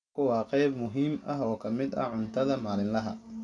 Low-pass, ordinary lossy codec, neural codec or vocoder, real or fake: 9.9 kHz; none; none; real